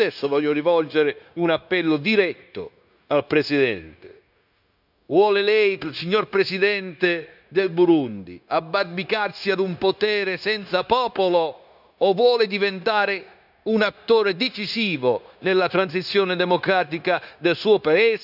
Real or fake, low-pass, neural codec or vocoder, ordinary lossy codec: fake; 5.4 kHz; codec, 16 kHz, 0.9 kbps, LongCat-Audio-Codec; none